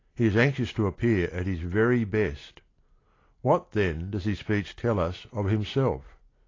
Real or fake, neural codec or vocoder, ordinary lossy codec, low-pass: real; none; AAC, 32 kbps; 7.2 kHz